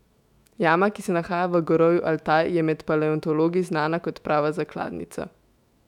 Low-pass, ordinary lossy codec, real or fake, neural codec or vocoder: 19.8 kHz; none; fake; autoencoder, 48 kHz, 128 numbers a frame, DAC-VAE, trained on Japanese speech